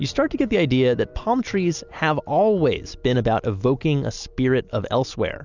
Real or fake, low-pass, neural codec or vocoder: real; 7.2 kHz; none